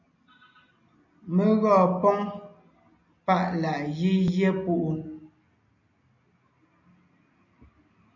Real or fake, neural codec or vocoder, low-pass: real; none; 7.2 kHz